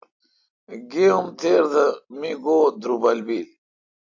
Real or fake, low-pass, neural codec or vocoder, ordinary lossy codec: real; 7.2 kHz; none; AAC, 48 kbps